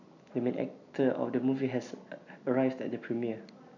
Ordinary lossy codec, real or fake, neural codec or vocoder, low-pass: none; real; none; 7.2 kHz